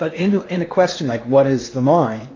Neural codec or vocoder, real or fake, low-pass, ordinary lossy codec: codec, 16 kHz in and 24 kHz out, 0.8 kbps, FocalCodec, streaming, 65536 codes; fake; 7.2 kHz; AAC, 32 kbps